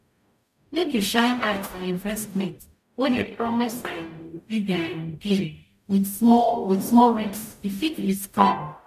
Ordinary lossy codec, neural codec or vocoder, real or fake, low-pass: none; codec, 44.1 kHz, 0.9 kbps, DAC; fake; 14.4 kHz